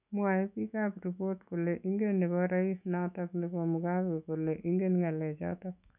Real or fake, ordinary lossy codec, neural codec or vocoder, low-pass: fake; none; autoencoder, 48 kHz, 128 numbers a frame, DAC-VAE, trained on Japanese speech; 3.6 kHz